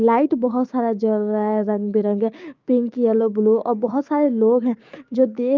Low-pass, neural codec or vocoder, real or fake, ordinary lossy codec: 7.2 kHz; codec, 16 kHz, 6 kbps, DAC; fake; Opus, 24 kbps